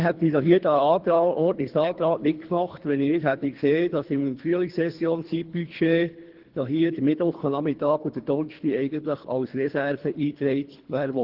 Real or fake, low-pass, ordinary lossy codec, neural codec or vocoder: fake; 5.4 kHz; Opus, 16 kbps; codec, 24 kHz, 3 kbps, HILCodec